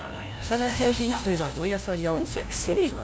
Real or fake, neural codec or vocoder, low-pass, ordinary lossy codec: fake; codec, 16 kHz, 0.5 kbps, FunCodec, trained on LibriTTS, 25 frames a second; none; none